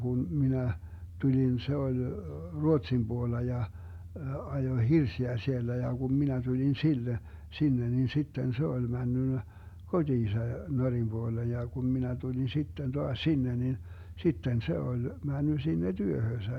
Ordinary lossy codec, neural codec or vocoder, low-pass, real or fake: none; none; 19.8 kHz; real